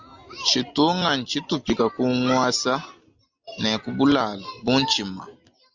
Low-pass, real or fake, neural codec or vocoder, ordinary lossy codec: 7.2 kHz; real; none; Opus, 64 kbps